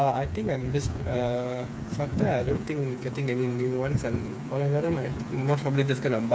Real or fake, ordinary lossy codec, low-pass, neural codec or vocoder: fake; none; none; codec, 16 kHz, 4 kbps, FreqCodec, smaller model